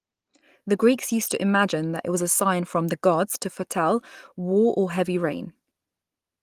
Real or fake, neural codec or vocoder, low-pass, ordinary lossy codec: real; none; 14.4 kHz; Opus, 24 kbps